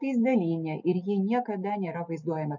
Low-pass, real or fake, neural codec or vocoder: 7.2 kHz; real; none